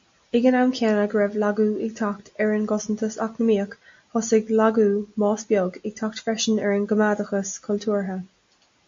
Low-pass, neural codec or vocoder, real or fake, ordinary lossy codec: 7.2 kHz; none; real; MP3, 48 kbps